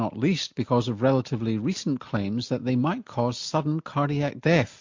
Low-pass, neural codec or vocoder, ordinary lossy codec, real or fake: 7.2 kHz; none; MP3, 48 kbps; real